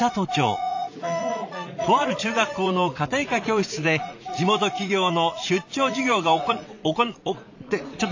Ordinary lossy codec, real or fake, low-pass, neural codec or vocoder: AAC, 48 kbps; fake; 7.2 kHz; vocoder, 44.1 kHz, 80 mel bands, Vocos